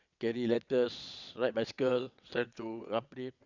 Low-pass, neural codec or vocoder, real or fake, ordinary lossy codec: 7.2 kHz; vocoder, 22.05 kHz, 80 mel bands, WaveNeXt; fake; none